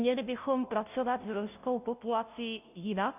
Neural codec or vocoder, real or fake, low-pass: codec, 16 kHz, 0.5 kbps, FunCodec, trained on Chinese and English, 25 frames a second; fake; 3.6 kHz